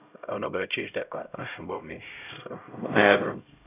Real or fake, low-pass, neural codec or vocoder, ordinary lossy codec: fake; 3.6 kHz; codec, 16 kHz, 0.5 kbps, X-Codec, HuBERT features, trained on LibriSpeech; none